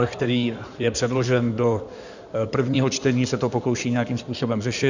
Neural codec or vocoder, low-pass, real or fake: codec, 16 kHz in and 24 kHz out, 2.2 kbps, FireRedTTS-2 codec; 7.2 kHz; fake